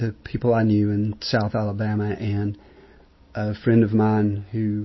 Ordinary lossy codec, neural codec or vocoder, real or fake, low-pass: MP3, 24 kbps; none; real; 7.2 kHz